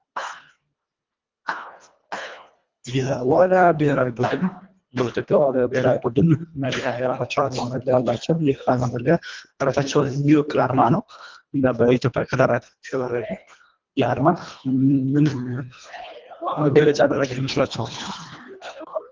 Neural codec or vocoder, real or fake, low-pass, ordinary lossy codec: codec, 24 kHz, 1.5 kbps, HILCodec; fake; 7.2 kHz; Opus, 32 kbps